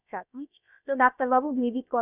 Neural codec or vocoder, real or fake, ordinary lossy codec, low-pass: codec, 16 kHz, about 1 kbps, DyCAST, with the encoder's durations; fake; none; 3.6 kHz